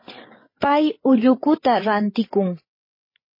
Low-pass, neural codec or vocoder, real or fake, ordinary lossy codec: 5.4 kHz; codec, 16 kHz, 8 kbps, FreqCodec, larger model; fake; MP3, 24 kbps